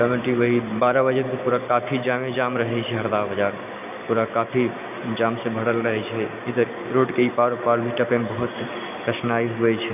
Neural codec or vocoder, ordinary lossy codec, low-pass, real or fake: none; none; 3.6 kHz; real